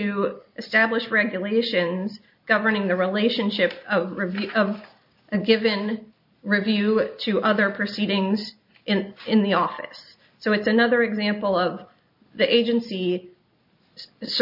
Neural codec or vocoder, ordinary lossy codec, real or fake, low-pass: none; MP3, 48 kbps; real; 5.4 kHz